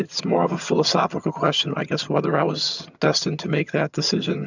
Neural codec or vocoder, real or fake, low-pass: vocoder, 22.05 kHz, 80 mel bands, HiFi-GAN; fake; 7.2 kHz